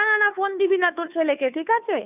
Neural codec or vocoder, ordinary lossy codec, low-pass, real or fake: codec, 16 kHz, 2 kbps, FunCodec, trained on Chinese and English, 25 frames a second; none; 3.6 kHz; fake